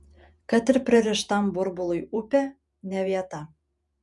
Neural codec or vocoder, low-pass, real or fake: vocoder, 48 kHz, 128 mel bands, Vocos; 10.8 kHz; fake